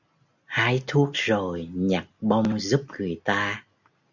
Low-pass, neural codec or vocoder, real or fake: 7.2 kHz; none; real